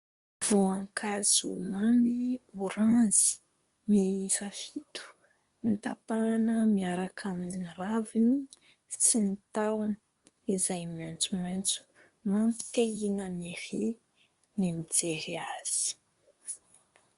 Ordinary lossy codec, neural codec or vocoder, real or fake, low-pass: Opus, 64 kbps; codec, 24 kHz, 1 kbps, SNAC; fake; 10.8 kHz